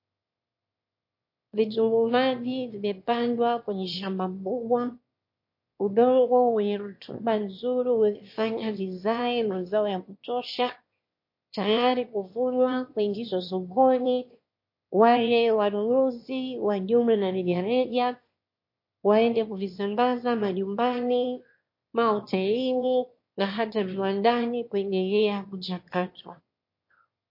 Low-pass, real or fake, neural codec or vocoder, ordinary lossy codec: 5.4 kHz; fake; autoencoder, 22.05 kHz, a latent of 192 numbers a frame, VITS, trained on one speaker; MP3, 32 kbps